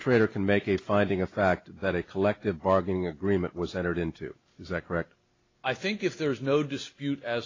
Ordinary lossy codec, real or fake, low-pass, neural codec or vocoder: AAC, 32 kbps; real; 7.2 kHz; none